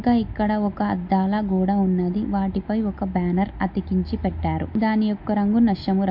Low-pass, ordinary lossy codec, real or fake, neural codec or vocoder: 5.4 kHz; MP3, 48 kbps; real; none